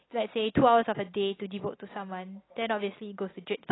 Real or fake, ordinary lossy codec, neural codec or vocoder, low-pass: real; AAC, 16 kbps; none; 7.2 kHz